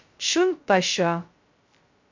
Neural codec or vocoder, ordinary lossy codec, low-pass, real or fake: codec, 16 kHz, 0.2 kbps, FocalCodec; MP3, 48 kbps; 7.2 kHz; fake